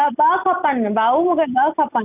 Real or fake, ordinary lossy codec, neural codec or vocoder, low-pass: real; none; none; 3.6 kHz